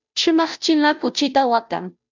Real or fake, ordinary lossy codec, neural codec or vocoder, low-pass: fake; MP3, 64 kbps; codec, 16 kHz, 0.5 kbps, FunCodec, trained on Chinese and English, 25 frames a second; 7.2 kHz